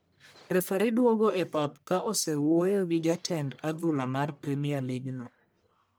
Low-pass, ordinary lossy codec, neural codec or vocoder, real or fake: none; none; codec, 44.1 kHz, 1.7 kbps, Pupu-Codec; fake